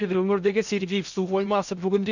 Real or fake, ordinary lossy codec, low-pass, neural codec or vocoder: fake; none; 7.2 kHz; codec, 16 kHz in and 24 kHz out, 0.6 kbps, FocalCodec, streaming, 2048 codes